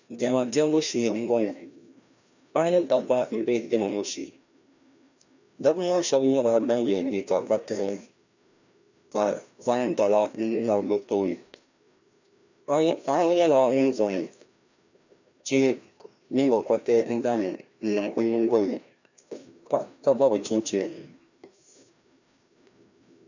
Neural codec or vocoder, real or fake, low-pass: codec, 16 kHz, 1 kbps, FreqCodec, larger model; fake; 7.2 kHz